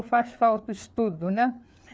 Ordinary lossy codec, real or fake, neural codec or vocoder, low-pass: none; fake; codec, 16 kHz, 4 kbps, FreqCodec, larger model; none